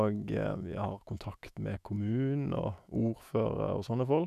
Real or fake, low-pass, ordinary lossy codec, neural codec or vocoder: fake; 14.4 kHz; none; autoencoder, 48 kHz, 128 numbers a frame, DAC-VAE, trained on Japanese speech